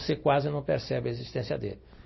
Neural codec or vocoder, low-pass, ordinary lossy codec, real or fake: none; 7.2 kHz; MP3, 24 kbps; real